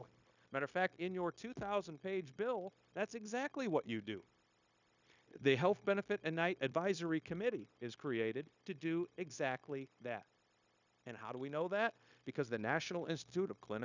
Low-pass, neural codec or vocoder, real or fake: 7.2 kHz; codec, 16 kHz, 0.9 kbps, LongCat-Audio-Codec; fake